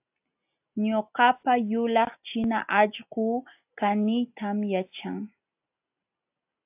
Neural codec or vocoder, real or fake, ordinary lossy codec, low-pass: none; real; AAC, 32 kbps; 3.6 kHz